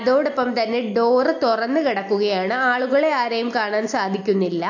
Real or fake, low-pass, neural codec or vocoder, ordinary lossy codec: real; 7.2 kHz; none; AAC, 48 kbps